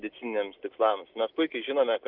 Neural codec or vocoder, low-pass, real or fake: none; 5.4 kHz; real